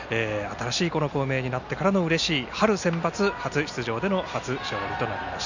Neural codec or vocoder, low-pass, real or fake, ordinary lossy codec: none; 7.2 kHz; real; none